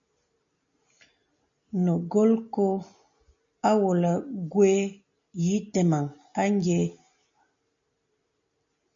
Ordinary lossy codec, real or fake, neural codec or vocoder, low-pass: AAC, 64 kbps; real; none; 7.2 kHz